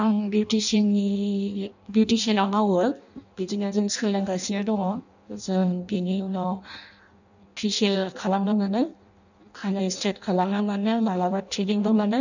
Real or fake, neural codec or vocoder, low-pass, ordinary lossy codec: fake; codec, 16 kHz in and 24 kHz out, 0.6 kbps, FireRedTTS-2 codec; 7.2 kHz; none